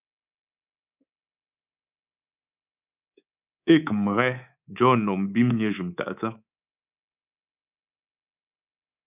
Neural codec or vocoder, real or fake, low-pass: codec, 24 kHz, 3.1 kbps, DualCodec; fake; 3.6 kHz